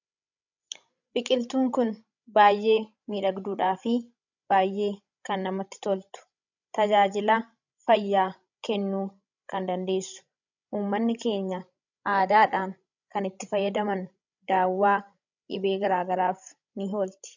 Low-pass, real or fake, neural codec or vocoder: 7.2 kHz; fake; codec, 16 kHz, 16 kbps, FreqCodec, larger model